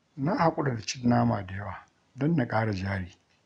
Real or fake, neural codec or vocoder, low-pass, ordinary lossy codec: real; none; 9.9 kHz; none